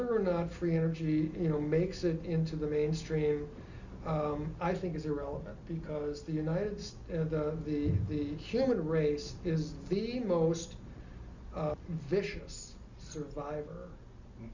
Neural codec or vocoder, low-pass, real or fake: none; 7.2 kHz; real